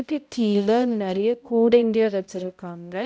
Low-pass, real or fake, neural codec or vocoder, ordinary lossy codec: none; fake; codec, 16 kHz, 0.5 kbps, X-Codec, HuBERT features, trained on balanced general audio; none